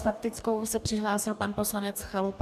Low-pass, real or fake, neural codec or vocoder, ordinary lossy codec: 14.4 kHz; fake; codec, 44.1 kHz, 2.6 kbps, DAC; AAC, 96 kbps